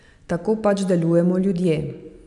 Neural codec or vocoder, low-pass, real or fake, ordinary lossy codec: none; 10.8 kHz; real; none